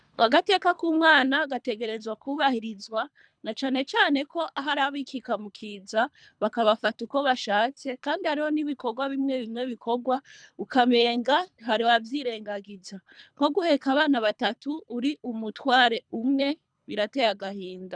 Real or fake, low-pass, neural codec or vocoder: fake; 9.9 kHz; codec, 24 kHz, 3 kbps, HILCodec